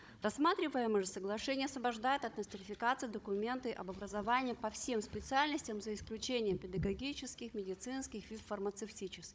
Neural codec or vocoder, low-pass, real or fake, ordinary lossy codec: codec, 16 kHz, 16 kbps, FunCodec, trained on Chinese and English, 50 frames a second; none; fake; none